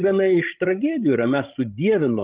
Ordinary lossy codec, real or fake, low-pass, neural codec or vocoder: Opus, 32 kbps; fake; 3.6 kHz; codec, 16 kHz, 16 kbps, FreqCodec, larger model